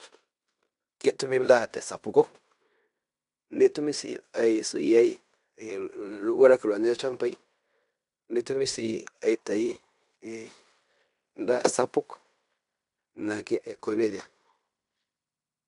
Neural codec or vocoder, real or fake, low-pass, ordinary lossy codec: codec, 16 kHz in and 24 kHz out, 0.9 kbps, LongCat-Audio-Codec, fine tuned four codebook decoder; fake; 10.8 kHz; none